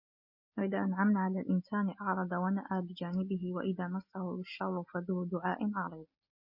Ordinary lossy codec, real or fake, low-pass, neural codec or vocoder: MP3, 32 kbps; real; 5.4 kHz; none